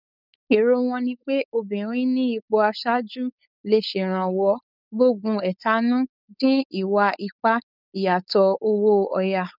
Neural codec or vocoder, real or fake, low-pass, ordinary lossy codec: codec, 16 kHz, 4.8 kbps, FACodec; fake; 5.4 kHz; none